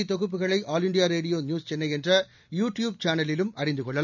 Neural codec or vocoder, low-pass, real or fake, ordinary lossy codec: none; 7.2 kHz; real; none